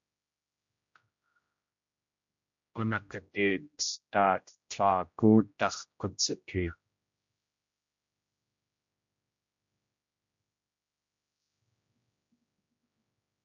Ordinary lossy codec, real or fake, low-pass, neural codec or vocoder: MP3, 48 kbps; fake; 7.2 kHz; codec, 16 kHz, 0.5 kbps, X-Codec, HuBERT features, trained on general audio